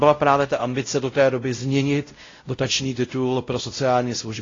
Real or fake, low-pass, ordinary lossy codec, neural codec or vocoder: fake; 7.2 kHz; AAC, 32 kbps; codec, 16 kHz, 0.5 kbps, X-Codec, WavLM features, trained on Multilingual LibriSpeech